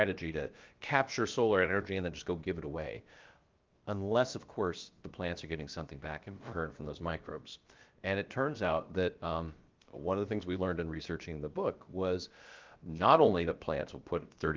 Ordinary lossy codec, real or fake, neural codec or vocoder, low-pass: Opus, 32 kbps; fake; codec, 16 kHz, about 1 kbps, DyCAST, with the encoder's durations; 7.2 kHz